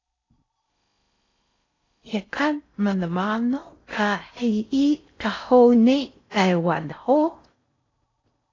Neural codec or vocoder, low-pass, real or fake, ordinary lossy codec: codec, 16 kHz in and 24 kHz out, 0.6 kbps, FocalCodec, streaming, 4096 codes; 7.2 kHz; fake; AAC, 32 kbps